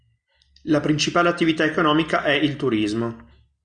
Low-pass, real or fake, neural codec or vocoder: 9.9 kHz; real; none